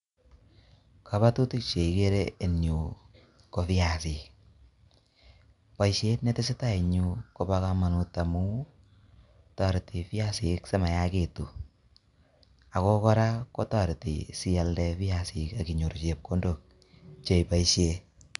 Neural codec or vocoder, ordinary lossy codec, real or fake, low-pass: none; MP3, 96 kbps; real; 10.8 kHz